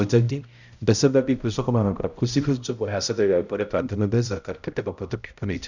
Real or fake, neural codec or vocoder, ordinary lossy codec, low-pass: fake; codec, 16 kHz, 0.5 kbps, X-Codec, HuBERT features, trained on balanced general audio; none; 7.2 kHz